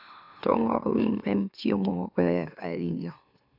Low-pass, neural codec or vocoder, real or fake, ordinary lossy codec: 5.4 kHz; autoencoder, 44.1 kHz, a latent of 192 numbers a frame, MeloTTS; fake; none